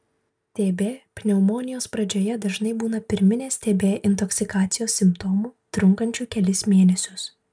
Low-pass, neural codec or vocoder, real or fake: 9.9 kHz; none; real